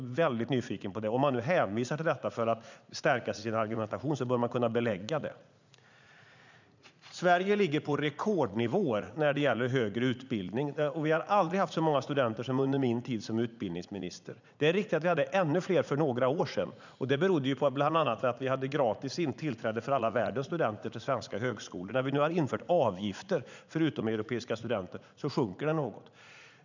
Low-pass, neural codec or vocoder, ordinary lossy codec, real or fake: 7.2 kHz; vocoder, 44.1 kHz, 80 mel bands, Vocos; none; fake